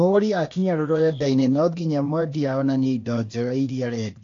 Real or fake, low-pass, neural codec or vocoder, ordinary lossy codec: fake; 7.2 kHz; codec, 16 kHz, 0.8 kbps, ZipCodec; AAC, 48 kbps